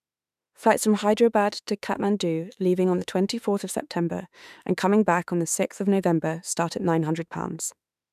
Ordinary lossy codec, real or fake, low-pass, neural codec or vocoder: none; fake; 14.4 kHz; autoencoder, 48 kHz, 32 numbers a frame, DAC-VAE, trained on Japanese speech